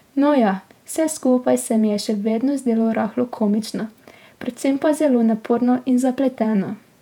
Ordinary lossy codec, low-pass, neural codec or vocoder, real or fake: MP3, 96 kbps; 19.8 kHz; vocoder, 48 kHz, 128 mel bands, Vocos; fake